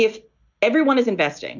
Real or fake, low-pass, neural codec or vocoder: real; 7.2 kHz; none